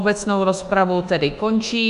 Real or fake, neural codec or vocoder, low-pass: fake; codec, 24 kHz, 1.2 kbps, DualCodec; 10.8 kHz